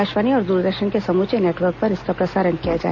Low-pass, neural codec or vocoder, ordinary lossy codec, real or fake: 7.2 kHz; none; none; real